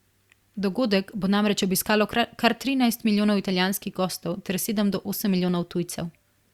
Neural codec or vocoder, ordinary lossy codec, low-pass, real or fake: none; Opus, 64 kbps; 19.8 kHz; real